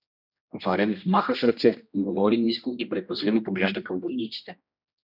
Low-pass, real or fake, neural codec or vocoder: 5.4 kHz; fake; codec, 16 kHz, 1 kbps, X-Codec, HuBERT features, trained on general audio